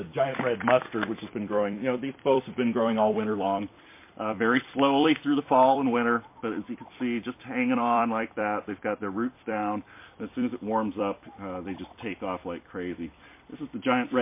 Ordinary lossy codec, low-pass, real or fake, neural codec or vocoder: MP3, 24 kbps; 3.6 kHz; fake; vocoder, 44.1 kHz, 128 mel bands every 256 samples, BigVGAN v2